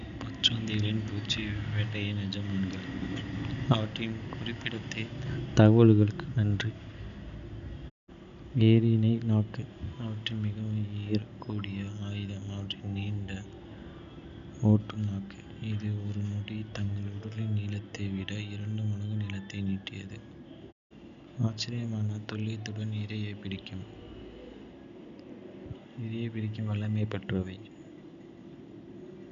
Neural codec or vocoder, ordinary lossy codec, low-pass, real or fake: none; none; 7.2 kHz; real